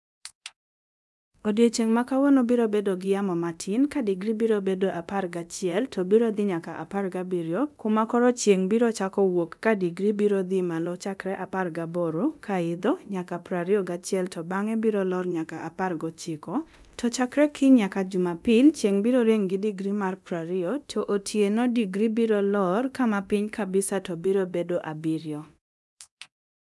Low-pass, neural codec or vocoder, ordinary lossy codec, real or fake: none; codec, 24 kHz, 0.9 kbps, DualCodec; none; fake